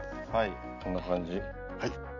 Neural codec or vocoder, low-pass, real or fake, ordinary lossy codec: none; 7.2 kHz; real; none